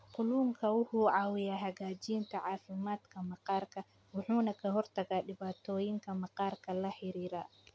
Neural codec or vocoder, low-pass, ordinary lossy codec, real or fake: none; none; none; real